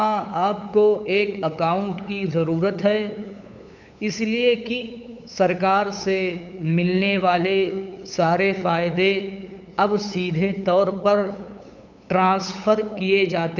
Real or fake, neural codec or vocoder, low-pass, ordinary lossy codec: fake; codec, 16 kHz, 8 kbps, FunCodec, trained on LibriTTS, 25 frames a second; 7.2 kHz; none